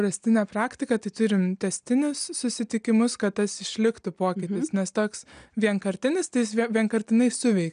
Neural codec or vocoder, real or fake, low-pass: none; real; 9.9 kHz